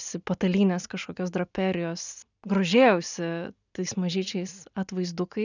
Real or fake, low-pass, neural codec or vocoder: real; 7.2 kHz; none